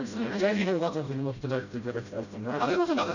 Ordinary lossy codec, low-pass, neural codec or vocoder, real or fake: none; 7.2 kHz; codec, 16 kHz, 0.5 kbps, FreqCodec, smaller model; fake